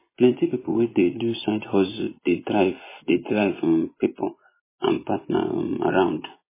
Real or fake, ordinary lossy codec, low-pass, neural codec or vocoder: fake; MP3, 16 kbps; 3.6 kHz; vocoder, 24 kHz, 100 mel bands, Vocos